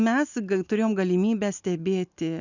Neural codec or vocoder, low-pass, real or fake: none; 7.2 kHz; real